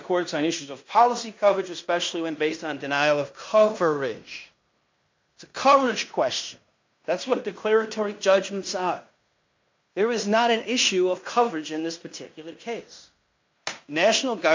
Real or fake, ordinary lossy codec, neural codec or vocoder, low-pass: fake; MP3, 64 kbps; codec, 16 kHz in and 24 kHz out, 0.9 kbps, LongCat-Audio-Codec, fine tuned four codebook decoder; 7.2 kHz